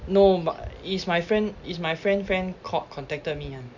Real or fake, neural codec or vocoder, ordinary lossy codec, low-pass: real; none; none; 7.2 kHz